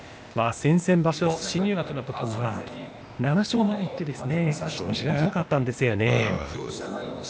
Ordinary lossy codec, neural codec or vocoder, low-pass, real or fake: none; codec, 16 kHz, 0.8 kbps, ZipCodec; none; fake